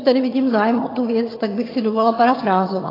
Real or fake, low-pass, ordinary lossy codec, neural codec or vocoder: fake; 5.4 kHz; AAC, 24 kbps; vocoder, 22.05 kHz, 80 mel bands, HiFi-GAN